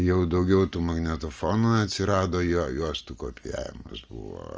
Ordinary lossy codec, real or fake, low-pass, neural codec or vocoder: Opus, 24 kbps; real; 7.2 kHz; none